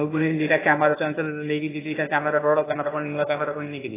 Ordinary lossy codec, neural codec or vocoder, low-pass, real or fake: AAC, 16 kbps; codec, 16 kHz, 0.8 kbps, ZipCodec; 3.6 kHz; fake